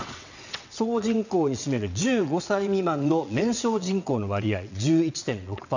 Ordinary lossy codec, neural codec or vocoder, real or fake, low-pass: none; vocoder, 22.05 kHz, 80 mel bands, WaveNeXt; fake; 7.2 kHz